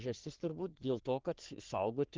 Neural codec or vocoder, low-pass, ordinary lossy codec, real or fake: codec, 44.1 kHz, 3.4 kbps, Pupu-Codec; 7.2 kHz; Opus, 16 kbps; fake